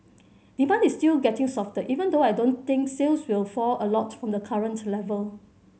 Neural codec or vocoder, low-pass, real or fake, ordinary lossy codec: none; none; real; none